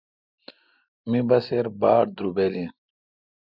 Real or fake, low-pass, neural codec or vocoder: real; 5.4 kHz; none